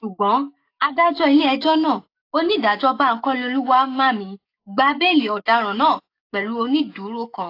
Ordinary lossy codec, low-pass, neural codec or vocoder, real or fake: AAC, 32 kbps; 5.4 kHz; codec, 44.1 kHz, 7.8 kbps, DAC; fake